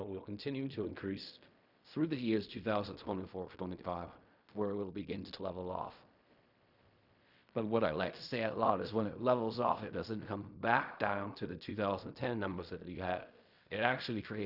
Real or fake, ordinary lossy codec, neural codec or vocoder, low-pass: fake; Opus, 64 kbps; codec, 16 kHz in and 24 kHz out, 0.4 kbps, LongCat-Audio-Codec, fine tuned four codebook decoder; 5.4 kHz